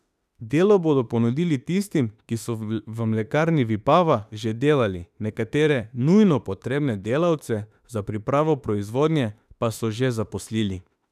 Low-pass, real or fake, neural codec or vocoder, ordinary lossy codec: 14.4 kHz; fake; autoencoder, 48 kHz, 32 numbers a frame, DAC-VAE, trained on Japanese speech; none